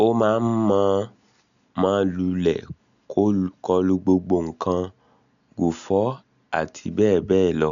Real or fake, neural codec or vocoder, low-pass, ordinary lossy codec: real; none; 7.2 kHz; none